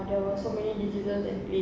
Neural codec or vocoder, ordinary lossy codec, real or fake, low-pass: none; none; real; none